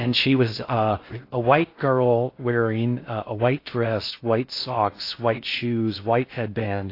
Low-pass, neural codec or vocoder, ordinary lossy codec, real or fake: 5.4 kHz; codec, 16 kHz in and 24 kHz out, 0.6 kbps, FocalCodec, streaming, 4096 codes; AAC, 32 kbps; fake